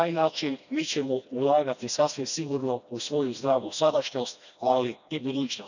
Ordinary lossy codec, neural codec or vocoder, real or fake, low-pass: none; codec, 16 kHz, 1 kbps, FreqCodec, smaller model; fake; 7.2 kHz